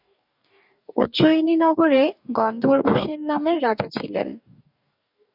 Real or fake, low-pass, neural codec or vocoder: fake; 5.4 kHz; codec, 44.1 kHz, 2.6 kbps, DAC